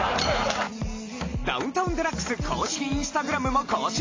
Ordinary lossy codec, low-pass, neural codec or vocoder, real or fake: AAC, 32 kbps; 7.2 kHz; none; real